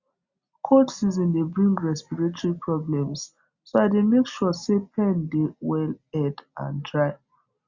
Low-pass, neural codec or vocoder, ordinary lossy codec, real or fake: 7.2 kHz; none; Opus, 64 kbps; real